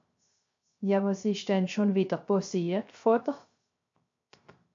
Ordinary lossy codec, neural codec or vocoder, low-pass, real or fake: MP3, 48 kbps; codec, 16 kHz, 0.3 kbps, FocalCodec; 7.2 kHz; fake